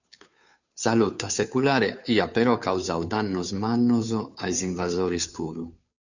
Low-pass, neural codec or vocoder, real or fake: 7.2 kHz; codec, 16 kHz, 2 kbps, FunCodec, trained on Chinese and English, 25 frames a second; fake